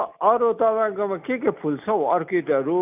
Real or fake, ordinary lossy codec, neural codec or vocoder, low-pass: real; none; none; 3.6 kHz